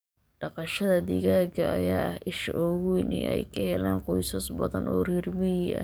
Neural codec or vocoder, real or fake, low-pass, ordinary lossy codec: codec, 44.1 kHz, 7.8 kbps, DAC; fake; none; none